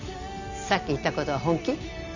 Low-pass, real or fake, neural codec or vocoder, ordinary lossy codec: 7.2 kHz; real; none; none